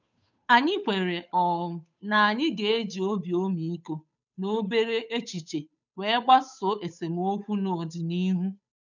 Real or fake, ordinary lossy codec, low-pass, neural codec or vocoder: fake; none; 7.2 kHz; codec, 16 kHz, 8 kbps, FunCodec, trained on Chinese and English, 25 frames a second